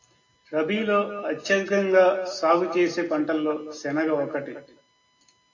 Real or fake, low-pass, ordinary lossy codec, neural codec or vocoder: real; 7.2 kHz; AAC, 48 kbps; none